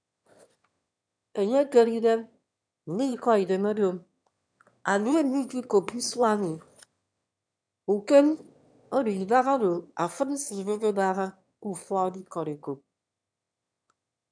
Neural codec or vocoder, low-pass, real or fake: autoencoder, 22.05 kHz, a latent of 192 numbers a frame, VITS, trained on one speaker; 9.9 kHz; fake